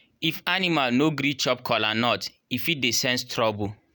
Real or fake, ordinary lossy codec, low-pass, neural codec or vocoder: real; none; none; none